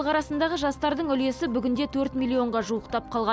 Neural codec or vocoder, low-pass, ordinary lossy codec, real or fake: none; none; none; real